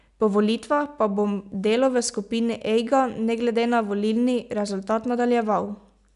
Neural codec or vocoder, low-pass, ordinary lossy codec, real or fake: none; 10.8 kHz; none; real